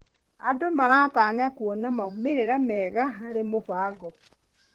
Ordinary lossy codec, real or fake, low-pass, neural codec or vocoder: Opus, 16 kbps; fake; 19.8 kHz; vocoder, 44.1 kHz, 128 mel bands, Pupu-Vocoder